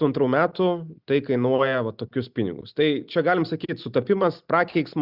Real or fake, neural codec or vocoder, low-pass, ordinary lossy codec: real; none; 5.4 kHz; Opus, 64 kbps